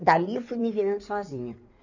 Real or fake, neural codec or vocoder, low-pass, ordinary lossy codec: fake; codec, 16 kHz in and 24 kHz out, 2.2 kbps, FireRedTTS-2 codec; 7.2 kHz; none